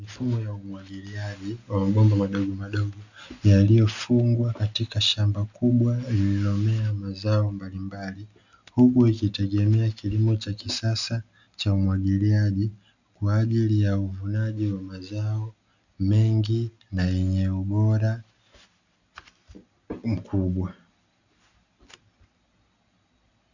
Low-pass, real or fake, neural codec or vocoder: 7.2 kHz; real; none